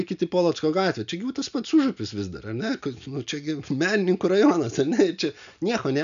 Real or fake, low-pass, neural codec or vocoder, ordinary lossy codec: real; 7.2 kHz; none; MP3, 96 kbps